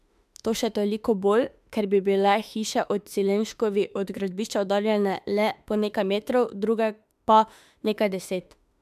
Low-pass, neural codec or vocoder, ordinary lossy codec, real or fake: 14.4 kHz; autoencoder, 48 kHz, 32 numbers a frame, DAC-VAE, trained on Japanese speech; MP3, 96 kbps; fake